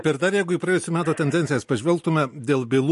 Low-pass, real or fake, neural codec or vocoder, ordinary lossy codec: 14.4 kHz; real; none; MP3, 48 kbps